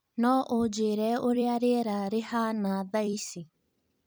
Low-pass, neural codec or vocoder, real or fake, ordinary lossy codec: none; vocoder, 44.1 kHz, 128 mel bands every 256 samples, BigVGAN v2; fake; none